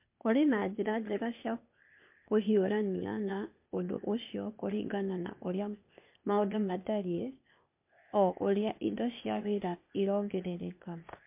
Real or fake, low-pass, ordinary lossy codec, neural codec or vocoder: fake; 3.6 kHz; MP3, 32 kbps; codec, 16 kHz, 0.8 kbps, ZipCodec